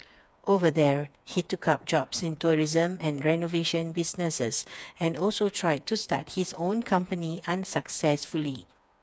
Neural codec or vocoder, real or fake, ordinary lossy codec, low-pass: codec, 16 kHz, 4 kbps, FreqCodec, smaller model; fake; none; none